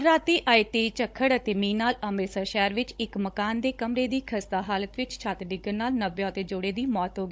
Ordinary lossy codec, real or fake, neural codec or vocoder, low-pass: none; fake; codec, 16 kHz, 16 kbps, FunCodec, trained on Chinese and English, 50 frames a second; none